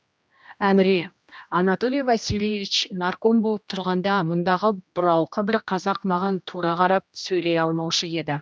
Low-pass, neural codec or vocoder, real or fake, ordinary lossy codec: none; codec, 16 kHz, 1 kbps, X-Codec, HuBERT features, trained on general audio; fake; none